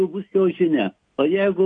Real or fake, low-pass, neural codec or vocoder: real; 9.9 kHz; none